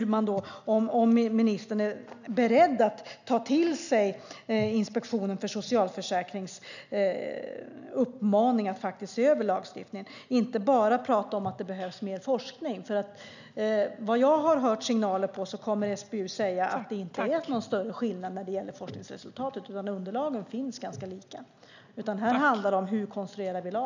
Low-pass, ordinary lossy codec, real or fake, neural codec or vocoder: 7.2 kHz; none; real; none